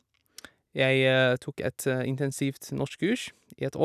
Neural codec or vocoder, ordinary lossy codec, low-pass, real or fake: none; none; 14.4 kHz; real